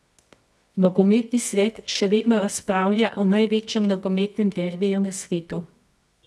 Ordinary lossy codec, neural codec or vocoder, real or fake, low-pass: none; codec, 24 kHz, 0.9 kbps, WavTokenizer, medium music audio release; fake; none